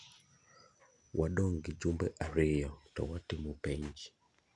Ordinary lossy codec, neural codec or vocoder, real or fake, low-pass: none; none; real; 10.8 kHz